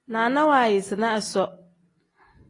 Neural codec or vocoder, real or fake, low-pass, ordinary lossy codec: none; real; 10.8 kHz; AAC, 32 kbps